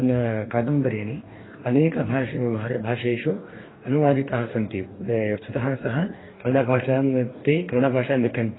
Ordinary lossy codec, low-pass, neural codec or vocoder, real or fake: AAC, 16 kbps; 7.2 kHz; codec, 44.1 kHz, 2.6 kbps, DAC; fake